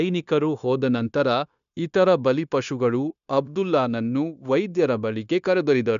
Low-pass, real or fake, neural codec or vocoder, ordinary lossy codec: 7.2 kHz; fake; codec, 16 kHz, 0.9 kbps, LongCat-Audio-Codec; none